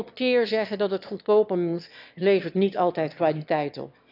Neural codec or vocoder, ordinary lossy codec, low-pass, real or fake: autoencoder, 22.05 kHz, a latent of 192 numbers a frame, VITS, trained on one speaker; none; 5.4 kHz; fake